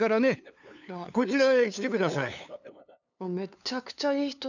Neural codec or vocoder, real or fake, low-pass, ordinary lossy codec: codec, 16 kHz, 2 kbps, FunCodec, trained on LibriTTS, 25 frames a second; fake; 7.2 kHz; none